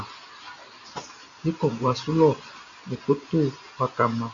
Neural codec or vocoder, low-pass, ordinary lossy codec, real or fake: none; 7.2 kHz; AAC, 64 kbps; real